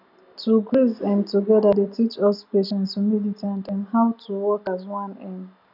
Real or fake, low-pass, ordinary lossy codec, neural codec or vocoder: real; 5.4 kHz; none; none